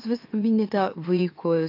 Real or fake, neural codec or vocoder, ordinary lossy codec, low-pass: fake; autoencoder, 44.1 kHz, a latent of 192 numbers a frame, MeloTTS; AAC, 32 kbps; 5.4 kHz